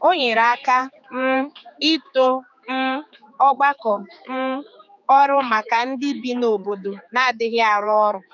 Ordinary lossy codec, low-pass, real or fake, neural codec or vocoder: none; 7.2 kHz; fake; codec, 16 kHz, 4 kbps, X-Codec, HuBERT features, trained on general audio